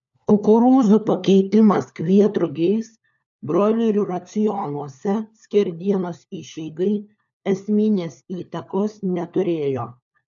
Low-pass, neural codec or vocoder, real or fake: 7.2 kHz; codec, 16 kHz, 4 kbps, FunCodec, trained on LibriTTS, 50 frames a second; fake